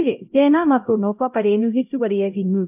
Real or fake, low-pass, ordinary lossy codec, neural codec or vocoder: fake; 3.6 kHz; AAC, 32 kbps; codec, 16 kHz, 0.5 kbps, X-Codec, HuBERT features, trained on LibriSpeech